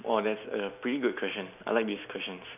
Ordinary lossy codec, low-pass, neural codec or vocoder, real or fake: none; 3.6 kHz; none; real